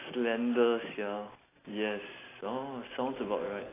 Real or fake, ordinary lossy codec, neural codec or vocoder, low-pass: real; AAC, 16 kbps; none; 3.6 kHz